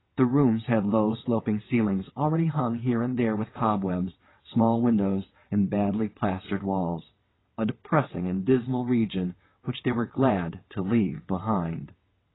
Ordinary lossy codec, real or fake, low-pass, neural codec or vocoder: AAC, 16 kbps; fake; 7.2 kHz; vocoder, 22.05 kHz, 80 mel bands, WaveNeXt